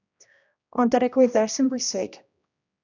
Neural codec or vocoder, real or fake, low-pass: codec, 16 kHz, 1 kbps, X-Codec, HuBERT features, trained on general audio; fake; 7.2 kHz